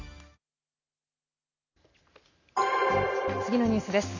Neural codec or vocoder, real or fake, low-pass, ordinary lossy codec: none; real; 7.2 kHz; none